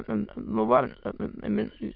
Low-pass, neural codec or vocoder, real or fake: 5.4 kHz; autoencoder, 22.05 kHz, a latent of 192 numbers a frame, VITS, trained on many speakers; fake